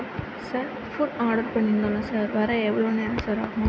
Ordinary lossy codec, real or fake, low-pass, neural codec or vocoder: none; real; none; none